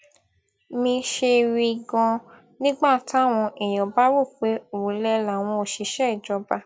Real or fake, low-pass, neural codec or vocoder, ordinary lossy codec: real; none; none; none